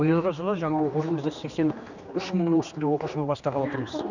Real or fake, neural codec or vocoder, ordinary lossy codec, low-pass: fake; codec, 16 kHz, 2 kbps, X-Codec, HuBERT features, trained on general audio; none; 7.2 kHz